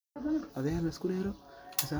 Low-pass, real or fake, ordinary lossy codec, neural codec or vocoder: none; real; none; none